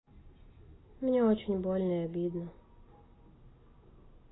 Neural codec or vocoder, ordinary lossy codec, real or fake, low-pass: none; AAC, 16 kbps; real; 7.2 kHz